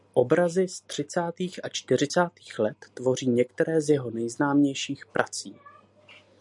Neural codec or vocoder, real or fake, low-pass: none; real; 10.8 kHz